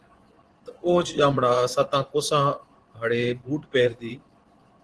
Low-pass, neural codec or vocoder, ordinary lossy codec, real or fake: 10.8 kHz; vocoder, 24 kHz, 100 mel bands, Vocos; Opus, 16 kbps; fake